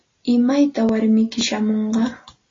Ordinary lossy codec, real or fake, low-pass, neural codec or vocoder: AAC, 32 kbps; real; 7.2 kHz; none